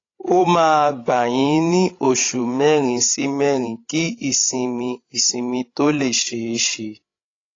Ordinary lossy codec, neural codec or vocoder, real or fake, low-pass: AAC, 32 kbps; codec, 16 kHz, 16 kbps, FreqCodec, larger model; fake; 7.2 kHz